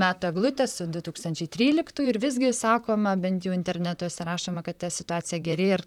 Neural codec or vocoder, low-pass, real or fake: vocoder, 44.1 kHz, 128 mel bands, Pupu-Vocoder; 19.8 kHz; fake